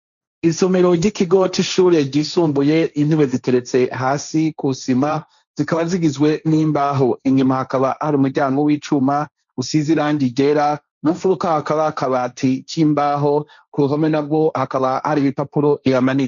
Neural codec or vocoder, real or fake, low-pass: codec, 16 kHz, 1.1 kbps, Voila-Tokenizer; fake; 7.2 kHz